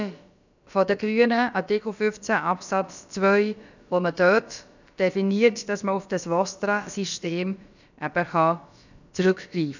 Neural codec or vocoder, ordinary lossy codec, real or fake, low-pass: codec, 16 kHz, about 1 kbps, DyCAST, with the encoder's durations; none; fake; 7.2 kHz